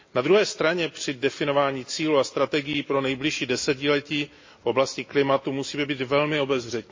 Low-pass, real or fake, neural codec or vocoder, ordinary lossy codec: 7.2 kHz; real; none; MP3, 32 kbps